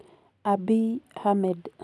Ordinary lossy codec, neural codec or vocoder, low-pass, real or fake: none; none; none; real